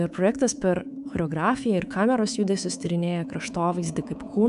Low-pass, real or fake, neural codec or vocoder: 10.8 kHz; fake; codec, 24 kHz, 3.1 kbps, DualCodec